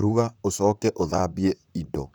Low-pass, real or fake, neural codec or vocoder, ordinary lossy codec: none; fake; vocoder, 44.1 kHz, 128 mel bands, Pupu-Vocoder; none